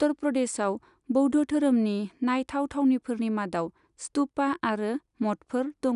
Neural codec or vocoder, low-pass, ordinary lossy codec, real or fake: none; 10.8 kHz; none; real